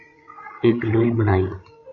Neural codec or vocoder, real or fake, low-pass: codec, 16 kHz, 16 kbps, FreqCodec, larger model; fake; 7.2 kHz